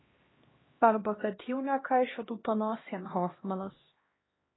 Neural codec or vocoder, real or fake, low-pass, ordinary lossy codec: codec, 16 kHz, 1 kbps, X-Codec, HuBERT features, trained on LibriSpeech; fake; 7.2 kHz; AAC, 16 kbps